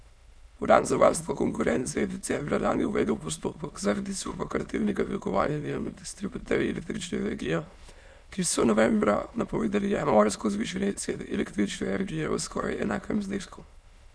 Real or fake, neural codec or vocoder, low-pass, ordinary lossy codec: fake; autoencoder, 22.05 kHz, a latent of 192 numbers a frame, VITS, trained on many speakers; none; none